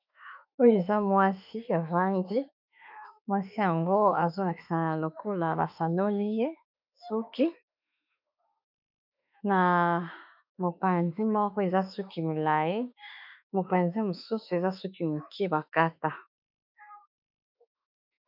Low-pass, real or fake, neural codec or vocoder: 5.4 kHz; fake; autoencoder, 48 kHz, 32 numbers a frame, DAC-VAE, trained on Japanese speech